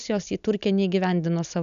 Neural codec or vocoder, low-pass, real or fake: none; 7.2 kHz; real